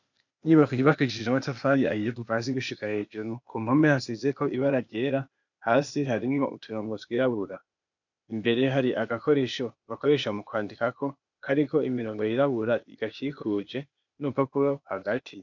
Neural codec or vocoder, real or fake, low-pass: codec, 16 kHz, 0.8 kbps, ZipCodec; fake; 7.2 kHz